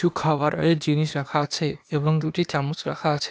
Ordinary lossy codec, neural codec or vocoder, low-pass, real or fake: none; codec, 16 kHz, 0.8 kbps, ZipCodec; none; fake